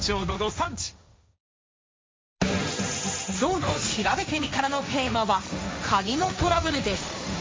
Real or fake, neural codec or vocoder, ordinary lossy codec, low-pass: fake; codec, 16 kHz, 1.1 kbps, Voila-Tokenizer; none; none